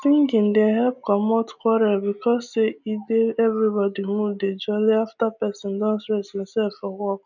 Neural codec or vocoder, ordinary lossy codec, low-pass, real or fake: none; none; 7.2 kHz; real